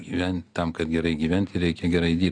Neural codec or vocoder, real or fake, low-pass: none; real; 9.9 kHz